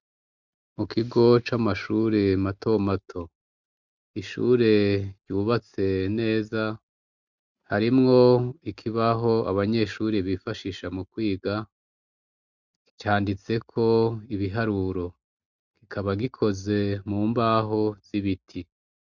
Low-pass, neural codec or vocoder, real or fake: 7.2 kHz; none; real